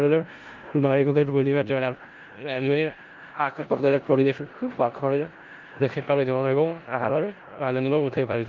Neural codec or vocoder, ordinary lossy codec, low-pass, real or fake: codec, 16 kHz in and 24 kHz out, 0.4 kbps, LongCat-Audio-Codec, four codebook decoder; Opus, 24 kbps; 7.2 kHz; fake